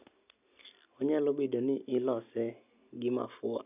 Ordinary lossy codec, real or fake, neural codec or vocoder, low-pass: none; real; none; 3.6 kHz